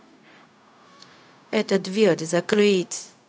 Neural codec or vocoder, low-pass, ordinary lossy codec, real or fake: codec, 16 kHz, 0.4 kbps, LongCat-Audio-Codec; none; none; fake